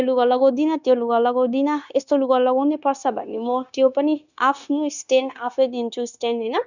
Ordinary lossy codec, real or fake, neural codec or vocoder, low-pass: none; fake; codec, 16 kHz, 0.9 kbps, LongCat-Audio-Codec; 7.2 kHz